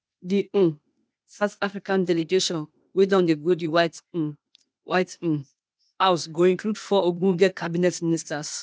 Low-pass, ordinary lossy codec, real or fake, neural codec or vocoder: none; none; fake; codec, 16 kHz, 0.8 kbps, ZipCodec